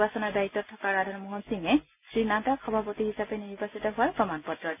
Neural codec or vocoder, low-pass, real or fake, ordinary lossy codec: none; 3.6 kHz; real; none